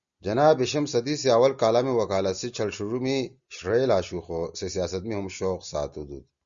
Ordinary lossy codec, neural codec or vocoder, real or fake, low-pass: Opus, 64 kbps; none; real; 7.2 kHz